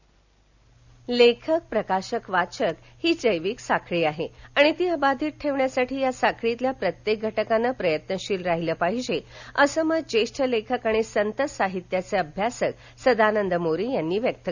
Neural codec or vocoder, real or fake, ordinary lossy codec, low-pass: none; real; none; 7.2 kHz